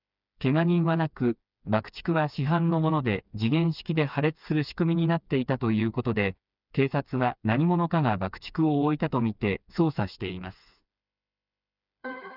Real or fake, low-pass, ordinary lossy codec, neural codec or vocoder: fake; 5.4 kHz; none; codec, 16 kHz, 4 kbps, FreqCodec, smaller model